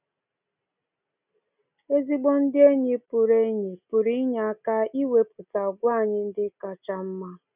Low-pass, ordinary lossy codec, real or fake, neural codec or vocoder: 3.6 kHz; none; real; none